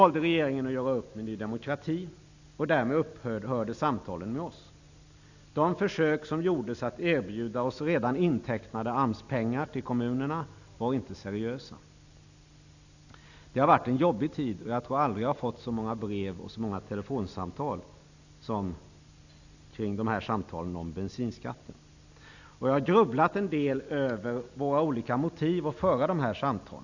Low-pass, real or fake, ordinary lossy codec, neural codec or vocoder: 7.2 kHz; real; none; none